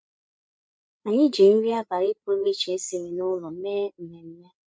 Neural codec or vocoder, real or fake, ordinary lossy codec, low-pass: codec, 16 kHz, 8 kbps, FreqCodec, larger model; fake; none; none